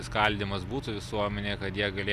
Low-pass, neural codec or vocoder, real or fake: 14.4 kHz; none; real